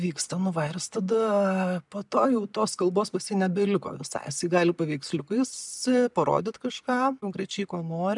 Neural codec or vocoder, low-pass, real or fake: none; 10.8 kHz; real